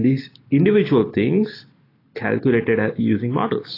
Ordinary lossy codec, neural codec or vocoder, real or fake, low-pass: AAC, 24 kbps; none; real; 5.4 kHz